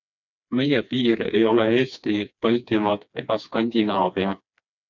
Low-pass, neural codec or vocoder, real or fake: 7.2 kHz; codec, 16 kHz, 2 kbps, FreqCodec, smaller model; fake